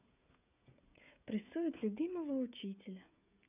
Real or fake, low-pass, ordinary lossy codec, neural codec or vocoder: fake; 3.6 kHz; none; codec, 16 kHz, 16 kbps, FreqCodec, smaller model